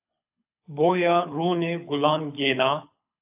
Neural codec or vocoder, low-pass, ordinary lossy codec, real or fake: codec, 24 kHz, 6 kbps, HILCodec; 3.6 kHz; AAC, 32 kbps; fake